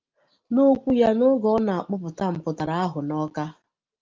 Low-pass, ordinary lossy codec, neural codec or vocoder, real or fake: 7.2 kHz; Opus, 32 kbps; none; real